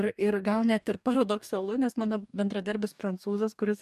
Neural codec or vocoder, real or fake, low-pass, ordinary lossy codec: codec, 44.1 kHz, 2.6 kbps, DAC; fake; 14.4 kHz; MP3, 96 kbps